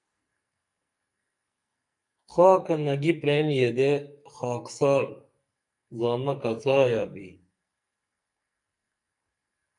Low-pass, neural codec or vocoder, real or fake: 10.8 kHz; codec, 44.1 kHz, 2.6 kbps, SNAC; fake